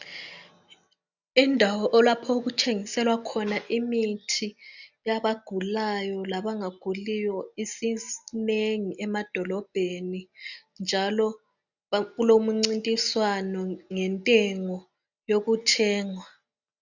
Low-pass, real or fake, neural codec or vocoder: 7.2 kHz; real; none